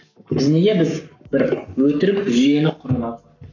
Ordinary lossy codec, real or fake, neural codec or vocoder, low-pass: none; fake; codec, 44.1 kHz, 7.8 kbps, Pupu-Codec; 7.2 kHz